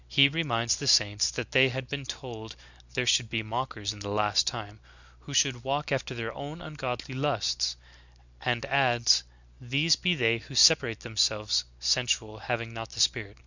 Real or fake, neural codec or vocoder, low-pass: real; none; 7.2 kHz